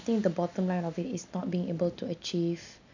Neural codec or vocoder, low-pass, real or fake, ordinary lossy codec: none; 7.2 kHz; real; none